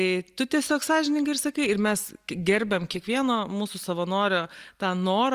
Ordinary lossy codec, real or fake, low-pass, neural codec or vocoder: Opus, 32 kbps; real; 14.4 kHz; none